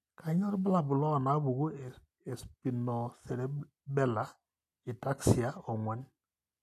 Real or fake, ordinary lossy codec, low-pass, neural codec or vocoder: real; AAC, 64 kbps; 14.4 kHz; none